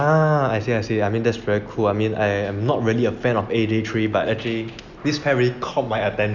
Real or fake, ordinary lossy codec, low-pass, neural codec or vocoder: real; none; 7.2 kHz; none